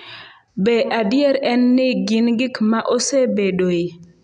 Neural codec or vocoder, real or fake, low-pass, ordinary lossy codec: none; real; 9.9 kHz; none